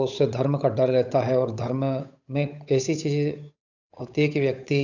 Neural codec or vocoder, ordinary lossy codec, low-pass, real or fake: codec, 16 kHz, 8 kbps, FunCodec, trained on Chinese and English, 25 frames a second; none; 7.2 kHz; fake